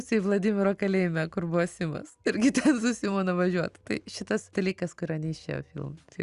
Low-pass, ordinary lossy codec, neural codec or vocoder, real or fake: 10.8 kHz; Opus, 64 kbps; none; real